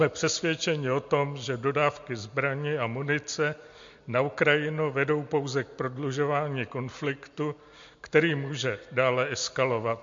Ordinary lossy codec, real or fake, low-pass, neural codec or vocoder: MP3, 48 kbps; real; 7.2 kHz; none